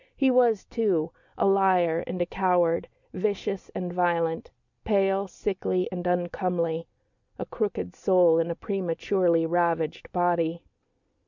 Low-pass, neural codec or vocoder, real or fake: 7.2 kHz; none; real